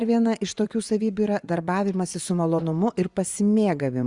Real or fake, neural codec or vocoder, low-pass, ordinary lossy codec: fake; vocoder, 24 kHz, 100 mel bands, Vocos; 10.8 kHz; Opus, 64 kbps